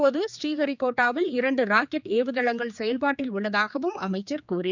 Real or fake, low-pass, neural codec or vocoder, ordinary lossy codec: fake; 7.2 kHz; codec, 16 kHz, 4 kbps, X-Codec, HuBERT features, trained on general audio; none